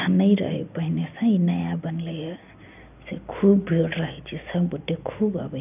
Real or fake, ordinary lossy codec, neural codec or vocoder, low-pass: real; none; none; 3.6 kHz